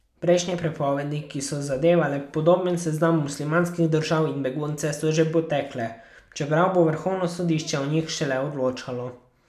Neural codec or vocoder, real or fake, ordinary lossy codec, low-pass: none; real; none; 14.4 kHz